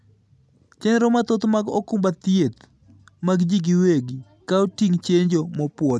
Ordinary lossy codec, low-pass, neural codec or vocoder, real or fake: none; none; none; real